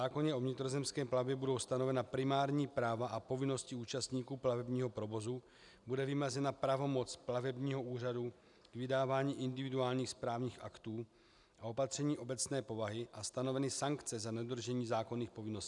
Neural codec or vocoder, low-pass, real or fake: none; 10.8 kHz; real